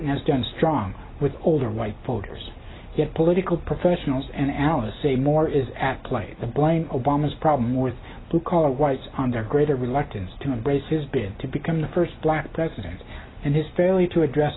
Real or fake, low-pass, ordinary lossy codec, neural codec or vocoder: real; 7.2 kHz; AAC, 16 kbps; none